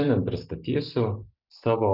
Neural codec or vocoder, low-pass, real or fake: none; 5.4 kHz; real